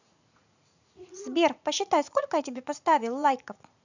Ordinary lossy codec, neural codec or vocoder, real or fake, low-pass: none; vocoder, 44.1 kHz, 80 mel bands, Vocos; fake; 7.2 kHz